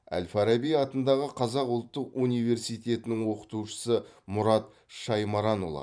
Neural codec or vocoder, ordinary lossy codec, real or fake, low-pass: vocoder, 44.1 kHz, 128 mel bands every 512 samples, BigVGAN v2; none; fake; 9.9 kHz